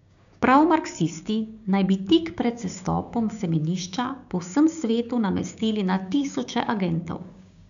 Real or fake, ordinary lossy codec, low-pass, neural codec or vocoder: fake; none; 7.2 kHz; codec, 16 kHz, 6 kbps, DAC